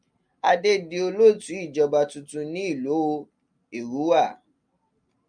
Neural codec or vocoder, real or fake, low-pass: none; real; 9.9 kHz